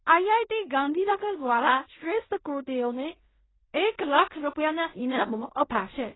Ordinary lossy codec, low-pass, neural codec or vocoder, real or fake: AAC, 16 kbps; 7.2 kHz; codec, 16 kHz in and 24 kHz out, 0.4 kbps, LongCat-Audio-Codec, fine tuned four codebook decoder; fake